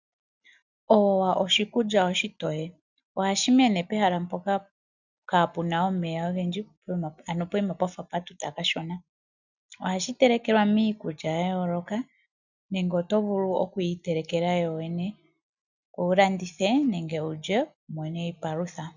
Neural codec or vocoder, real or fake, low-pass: none; real; 7.2 kHz